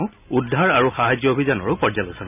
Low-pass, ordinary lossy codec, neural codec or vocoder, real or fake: 3.6 kHz; none; none; real